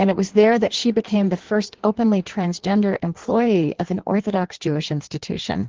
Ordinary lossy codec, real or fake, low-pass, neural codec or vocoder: Opus, 16 kbps; fake; 7.2 kHz; codec, 16 kHz in and 24 kHz out, 1.1 kbps, FireRedTTS-2 codec